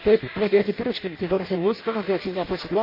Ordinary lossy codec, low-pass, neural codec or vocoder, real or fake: MP3, 24 kbps; 5.4 kHz; codec, 16 kHz in and 24 kHz out, 0.6 kbps, FireRedTTS-2 codec; fake